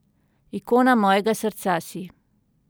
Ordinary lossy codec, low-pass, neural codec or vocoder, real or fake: none; none; none; real